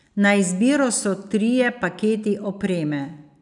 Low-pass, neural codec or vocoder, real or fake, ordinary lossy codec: 10.8 kHz; none; real; none